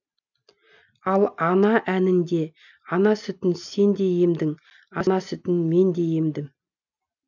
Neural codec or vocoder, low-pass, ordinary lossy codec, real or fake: none; 7.2 kHz; none; real